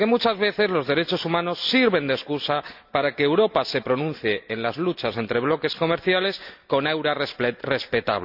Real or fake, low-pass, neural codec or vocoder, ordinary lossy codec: real; 5.4 kHz; none; none